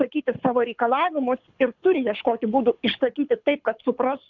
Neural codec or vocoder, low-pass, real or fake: codec, 24 kHz, 6 kbps, HILCodec; 7.2 kHz; fake